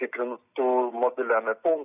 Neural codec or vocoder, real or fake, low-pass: vocoder, 44.1 kHz, 128 mel bands every 256 samples, BigVGAN v2; fake; 3.6 kHz